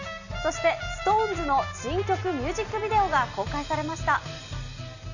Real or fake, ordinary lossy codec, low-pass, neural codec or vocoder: real; none; 7.2 kHz; none